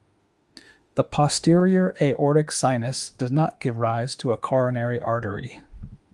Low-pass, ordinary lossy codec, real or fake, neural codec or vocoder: 10.8 kHz; Opus, 32 kbps; fake; autoencoder, 48 kHz, 32 numbers a frame, DAC-VAE, trained on Japanese speech